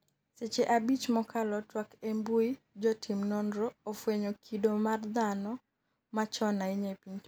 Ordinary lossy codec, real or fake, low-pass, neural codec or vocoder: none; real; none; none